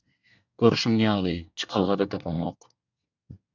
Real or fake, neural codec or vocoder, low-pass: fake; codec, 24 kHz, 1 kbps, SNAC; 7.2 kHz